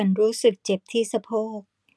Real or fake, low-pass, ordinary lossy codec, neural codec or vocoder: real; none; none; none